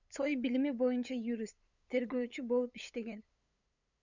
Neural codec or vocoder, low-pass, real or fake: codec, 16 kHz, 8 kbps, FunCodec, trained on LibriTTS, 25 frames a second; 7.2 kHz; fake